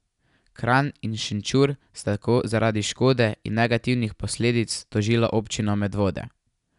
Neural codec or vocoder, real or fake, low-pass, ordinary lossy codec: none; real; 10.8 kHz; none